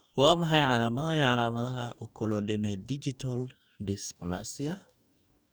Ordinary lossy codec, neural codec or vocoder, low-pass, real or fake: none; codec, 44.1 kHz, 2.6 kbps, DAC; none; fake